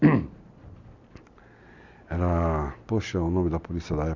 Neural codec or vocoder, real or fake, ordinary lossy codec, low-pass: none; real; none; 7.2 kHz